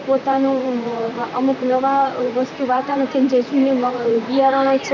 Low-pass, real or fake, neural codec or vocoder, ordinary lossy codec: 7.2 kHz; fake; vocoder, 44.1 kHz, 128 mel bands, Pupu-Vocoder; none